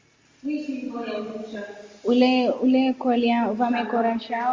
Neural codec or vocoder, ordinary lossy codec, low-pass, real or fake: none; Opus, 32 kbps; 7.2 kHz; real